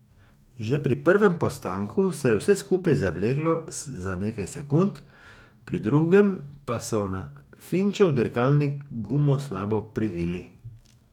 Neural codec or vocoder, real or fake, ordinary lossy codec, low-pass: codec, 44.1 kHz, 2.6 kbps, DAC; fake; none; 19.8 kHz